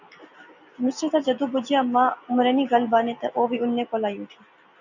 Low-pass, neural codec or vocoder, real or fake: 7.2 kHz; none; real